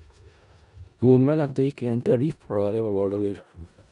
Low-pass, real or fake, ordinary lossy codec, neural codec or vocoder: 10.8 kHz; fake; none; codec, 16 kHz in and 24 kHz out, 0.4 kbps, LongCat-Audio-Codec, four codebook decoder